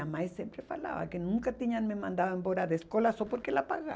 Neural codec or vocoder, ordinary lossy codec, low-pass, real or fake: none; none; none; real